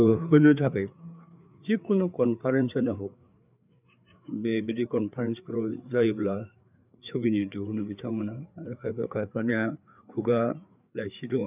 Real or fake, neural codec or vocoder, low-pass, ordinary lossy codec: fake; codec, 16 kHz, 4 kbps, FreqCodec, larger model; 3.6 kHz; none